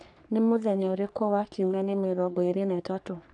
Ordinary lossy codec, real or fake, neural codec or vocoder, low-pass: none; fake; codec, 44.1 kHz, 3.4 kbps, Pupu-Codec; 10.8 kHz